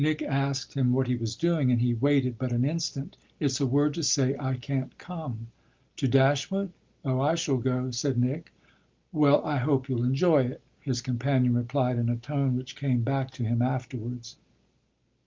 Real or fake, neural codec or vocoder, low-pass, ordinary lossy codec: real; none; 7.2 kHz; Opus, 24 kbps